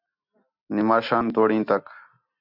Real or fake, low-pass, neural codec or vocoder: real; 5.4 kHz; none